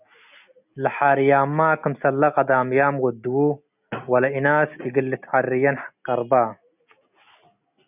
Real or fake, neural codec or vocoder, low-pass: real; none; 3.6 kHz